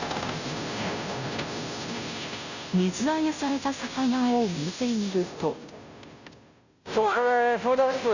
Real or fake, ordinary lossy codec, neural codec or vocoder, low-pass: fake; none; codec, 16 kHz, 0.5 kbps, FunCodec, trained on Chinese and English, 25 frames a second; 7.2 kHz